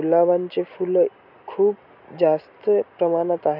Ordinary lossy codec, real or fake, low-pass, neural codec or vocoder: none; real; 5.4 kHz; none